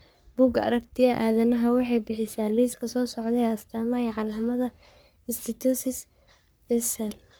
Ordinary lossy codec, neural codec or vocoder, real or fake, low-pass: none; codec, 44.1 kHz, 3.4 kbps, Pupu-Codec; fake; none